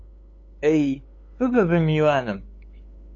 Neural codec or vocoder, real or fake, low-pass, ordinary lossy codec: codec, 16 kHz, 8 kbps, FunCodec, trained on LibriTTS, 25 frames a second; fake; 7.2 kHz; AAC, 48 kbps